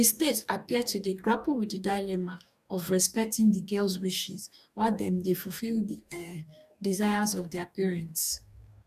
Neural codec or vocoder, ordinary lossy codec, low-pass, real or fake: codec, 44.1 kHz, 2.6 kbps, DAC; none; 14.4 kHz; fake